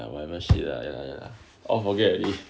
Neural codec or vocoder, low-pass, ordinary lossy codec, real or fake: none; none; none; real